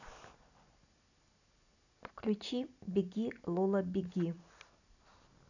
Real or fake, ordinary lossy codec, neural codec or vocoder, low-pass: real; none; none; 7.2 kHz